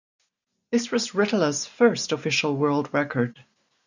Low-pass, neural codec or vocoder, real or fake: 7.2 kHz; none; real